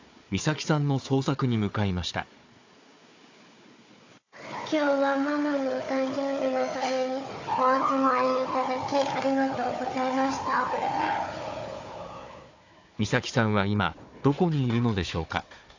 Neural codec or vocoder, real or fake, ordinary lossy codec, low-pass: codec, 16 kHz, 4 kbps, FunCodec, trained on Chinese and English, 50 frames a second; fake; AAC, 48 kbps; 7.2 kHz